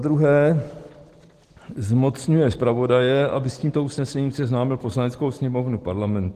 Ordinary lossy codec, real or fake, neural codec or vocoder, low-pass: Opus, 16 kbps; real; none; 14.4 kHz